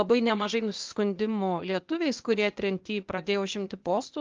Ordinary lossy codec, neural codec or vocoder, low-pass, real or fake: Opus, 24 kbps; codec, 16 kHz, 0.8 kbps, ZipCodec; 7.2 kHz; fake